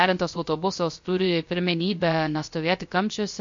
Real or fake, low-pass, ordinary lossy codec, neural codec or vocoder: fake; 7.2 kHz; MP3, 48 kbps; codec, 16 kHz, 0.3 kbps, FocalCodec